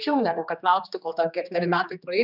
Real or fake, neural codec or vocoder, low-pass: fake; codec, 16 kHz, 2 kbps, X-Codec, HuBERT features, trained on general audio; 5.4 kHz